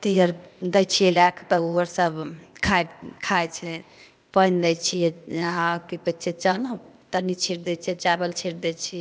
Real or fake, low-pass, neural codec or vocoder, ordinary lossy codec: fake; none; codec, 16 kHz, 0.8 kbps, ZipCodec; none